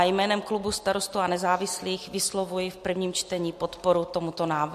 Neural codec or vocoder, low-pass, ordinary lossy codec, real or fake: none; 14.4 kHz; MP3, 64 kbps; real